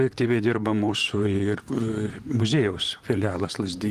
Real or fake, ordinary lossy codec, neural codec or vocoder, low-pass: fake; Opus, 32 kbps; vocoder, 44.1 kHz, 128 mel bands, Pupu-Vocoder; 14.4 kHz